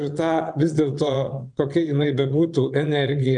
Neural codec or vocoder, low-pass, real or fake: vocoder, 22.05 kHz, 80 mel bands, Vocos; 9.9 kHz; fake